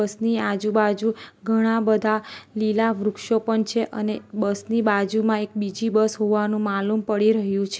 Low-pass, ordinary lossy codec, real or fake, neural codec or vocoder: none; none; real; none